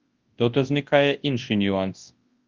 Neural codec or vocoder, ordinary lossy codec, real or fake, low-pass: codec, 24 kHz, 0.9 kbps, WavTokenizer, large speech release; Opus, 32 kbps; fake; 7.2 kHz